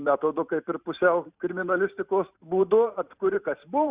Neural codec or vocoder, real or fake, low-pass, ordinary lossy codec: vocoder, 44.1 kHz, 128 mel bands every 512 samples, BigVGAN v2; fake; 3.6 kHz; Opus, 64 kbps